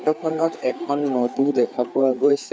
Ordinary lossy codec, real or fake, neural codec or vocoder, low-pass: none; fake; codec, 16 kHz, 4 kbps, FreqCodec, larger model; none